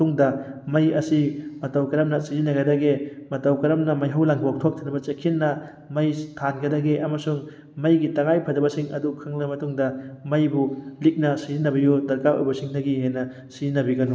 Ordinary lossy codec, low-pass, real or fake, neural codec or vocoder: none; none; real; none